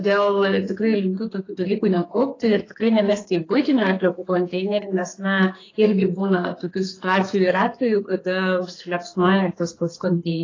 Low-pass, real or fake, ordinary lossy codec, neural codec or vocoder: 7.2 kHz; fake; AAC, 32 kbps; codec, 32 kHz, 1.9 kbps, SNAC